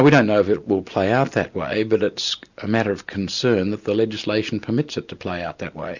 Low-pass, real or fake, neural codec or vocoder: 7.2 kHz; real; none